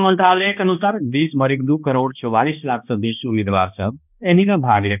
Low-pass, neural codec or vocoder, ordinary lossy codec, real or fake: 3.6 kHz; codec, 16 kHz, 1 kbps, X-Codec, HuBERT features, trained on balanced general audio; none; fake